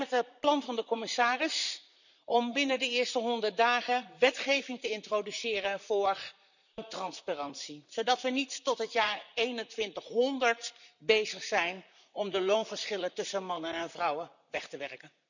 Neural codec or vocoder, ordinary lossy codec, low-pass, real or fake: vocoder, 44.1 kHz, 128 mel bands, Pupu-Vocoder; none; 7.2 kHz; fake